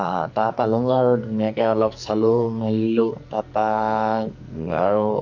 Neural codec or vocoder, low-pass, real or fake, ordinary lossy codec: codec, 44.1 kHz, 2.6 kbps, SNAC; 7.2 kHz; fake; none